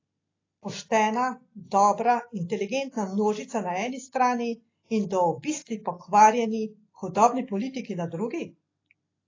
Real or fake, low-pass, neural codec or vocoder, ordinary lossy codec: real; 7.2 kHz; none; AAC, 32 kbps